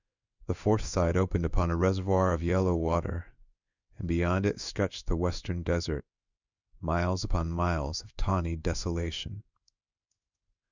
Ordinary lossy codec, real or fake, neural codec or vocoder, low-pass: Opus, 64 kbps; fake; codec, 16 kHz in and 24 kHz out, 1 kbps, XY-Tokenizer; 7.2 kHz